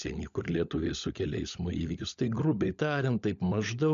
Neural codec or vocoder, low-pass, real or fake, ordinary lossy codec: codec, 16 kHz, 16 kbps, FunCodec, trained on LibriTTS, 50 frames a second; 7.2 kHz; fake; AAC, 96 kbps